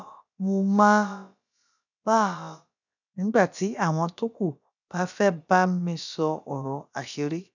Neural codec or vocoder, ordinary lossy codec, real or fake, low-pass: codec, 16 kHz, about 1 kbps, DyCAST, with the encoder's durations; none; fake; 7.2 kHz